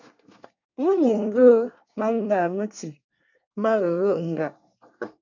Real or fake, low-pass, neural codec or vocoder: fake; 7.2 kHz; codec, 24 kHz, 1 kbps, SNAC